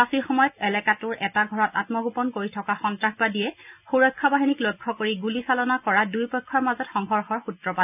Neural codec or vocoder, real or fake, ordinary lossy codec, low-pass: none; real; none; 3.6 kHz